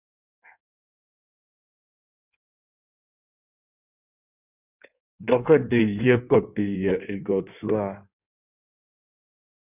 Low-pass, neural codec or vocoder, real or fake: 3.6 kHz; codec, 16 kHz in and 24 kHz out, 1.1 kbps, FireRedTTS-2 codec; fake